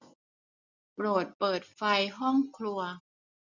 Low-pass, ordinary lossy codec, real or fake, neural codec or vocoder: 7.2 kHz; none; real; none